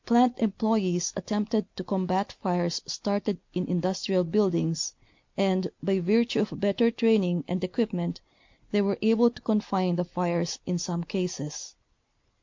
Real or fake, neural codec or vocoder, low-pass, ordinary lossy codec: real; none; 7.2 kHz; MP3, 48 kbps